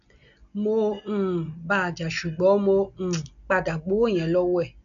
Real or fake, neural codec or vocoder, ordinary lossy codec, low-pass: real; none; none; 7.2 kHz